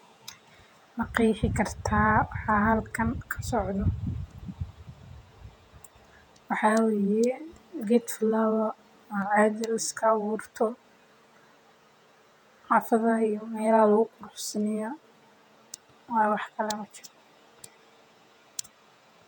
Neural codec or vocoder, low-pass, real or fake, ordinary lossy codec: vocoder, 48 kHz, 128 mel bands, Vocos; 19.8 kHz; fake; none